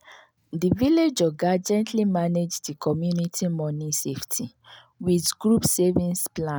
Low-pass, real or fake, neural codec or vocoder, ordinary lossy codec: none; real; none; none